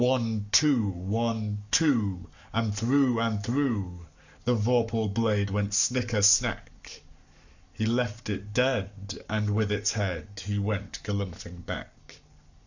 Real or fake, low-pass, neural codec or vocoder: fake; 7.2 kHz; codec, 44.1 kHz, 7.8 kbps, Pupu-Codec